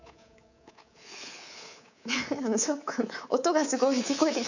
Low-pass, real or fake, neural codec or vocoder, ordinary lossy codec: 7.2 kHz; fake; codec, 24 kHz, 3.1 kbps, DualCodec; none